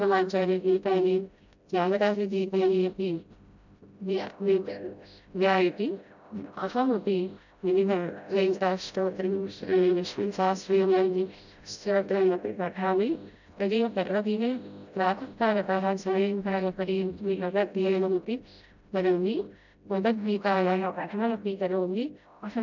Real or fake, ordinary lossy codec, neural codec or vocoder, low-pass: fake; none; codec, 16 kHz, 0.5 kbps, FreqCodec, smaller model; 7.2 kHz